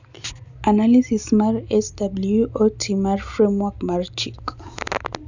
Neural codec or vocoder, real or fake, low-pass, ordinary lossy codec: none; real; 7.2 kHz; none